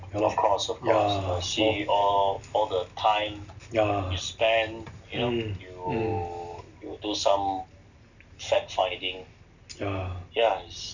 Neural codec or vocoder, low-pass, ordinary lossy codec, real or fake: none; 7.2 kHz; none; real